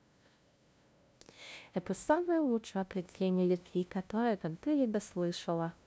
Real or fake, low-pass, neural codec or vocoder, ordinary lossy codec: fake; none; codec, 16 kHz, 0.5 kbps, FunCodec, trained on LibriTTS, 25 frames a second; none